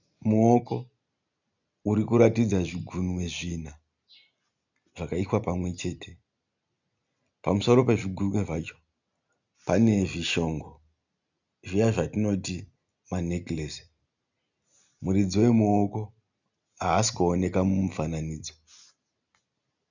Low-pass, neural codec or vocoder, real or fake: 7.2 kHz; none; real